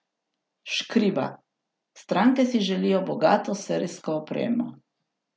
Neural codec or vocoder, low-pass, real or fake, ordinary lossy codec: none; none; real; none